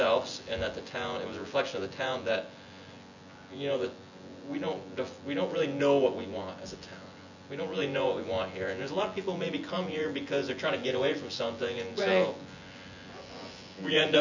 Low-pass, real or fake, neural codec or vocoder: 7.2 kHz; fake; vocoder, 24 kHz, 100 mel bands, Vocos